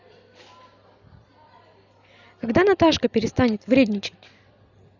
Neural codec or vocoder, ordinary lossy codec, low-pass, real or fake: none; none; 7.2 kHz; real